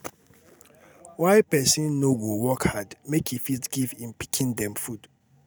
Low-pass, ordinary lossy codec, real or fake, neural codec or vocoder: none; none; real; none